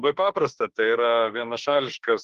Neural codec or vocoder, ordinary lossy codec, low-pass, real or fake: autoencoder, 48 kHz, 32 numbers a frame, DAC-VAE, trained on Japanese speech; Opus, 16 kbps; 14.4 kHz; fake